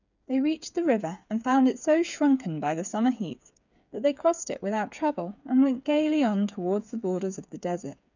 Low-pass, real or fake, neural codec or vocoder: 7.2 kHz; fake; codec, 16 kHz, 8 kbps, FreqCodec, smaller model